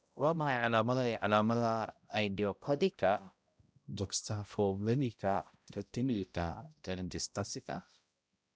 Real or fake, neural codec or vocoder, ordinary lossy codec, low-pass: fake; codec, 16 kHz, 0.5 kbps, X-Codec, HuBERT features, trained on balanced general audio; none; none